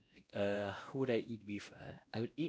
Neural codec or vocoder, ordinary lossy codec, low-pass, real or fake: codec, 16 kHz, 1 kbps, X-Codec, WavLM features, trained on Multilingual LibriSpeech; none; none; fake